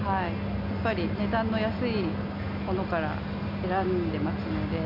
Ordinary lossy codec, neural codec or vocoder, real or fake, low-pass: none; none; real; 5.4 kHz